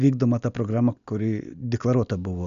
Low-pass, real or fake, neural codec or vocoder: 7.2 kHz; real; none